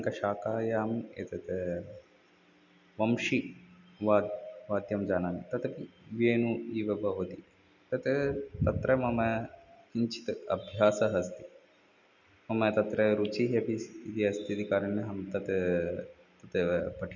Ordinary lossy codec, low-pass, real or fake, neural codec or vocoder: none; 7.2 kHz; real; none